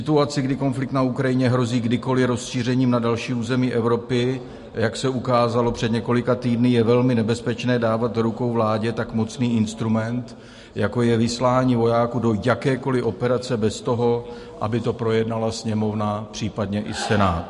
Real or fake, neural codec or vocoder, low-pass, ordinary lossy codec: real; none; 14.4 kHz; MP3, 48 kbps